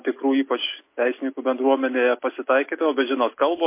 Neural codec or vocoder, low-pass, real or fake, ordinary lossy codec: none; 3.6 kHz; real; MP3, 24 kbps